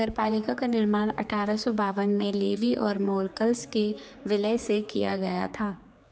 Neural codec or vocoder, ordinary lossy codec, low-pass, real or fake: codec, 16 kHz, 4 kbps, X-Codec, HuBERT features, trained on general audio; none; none; fake